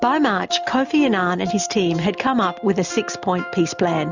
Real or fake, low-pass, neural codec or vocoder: real; 7.2 kHz; none